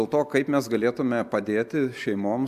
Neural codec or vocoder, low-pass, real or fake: none; 14.4 kHz; real